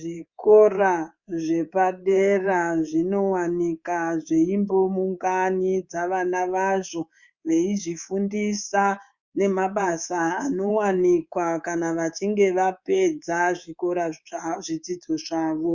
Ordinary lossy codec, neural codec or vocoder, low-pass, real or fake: Opus, 64 kbps; codec, 24 kHz, 3.1 kbps, DualCodec; 7.2 kHz; fake